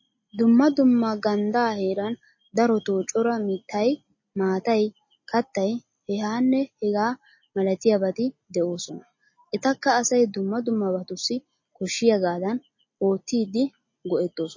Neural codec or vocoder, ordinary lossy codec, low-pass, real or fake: none; MP3, 32 kbps; 7.2 kHz; real